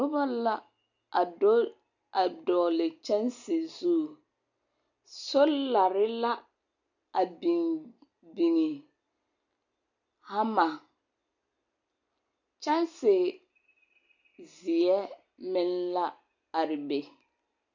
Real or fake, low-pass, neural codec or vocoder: real; 7.2 kHz; none